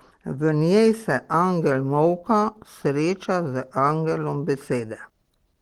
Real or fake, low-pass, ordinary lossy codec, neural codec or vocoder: real; 19.8 kHz; Opus, 16 kbps; none